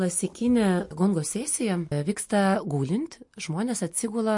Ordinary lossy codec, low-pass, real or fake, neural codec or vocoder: MP3, 48 kbps; 10.8 kHz; real; none